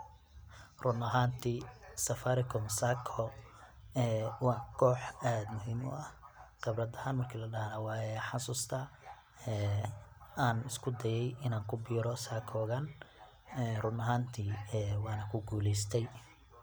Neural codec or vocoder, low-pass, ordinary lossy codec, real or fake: none; none; none; real